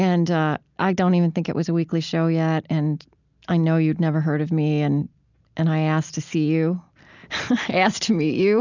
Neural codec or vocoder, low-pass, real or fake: none; 7.2 kHz; real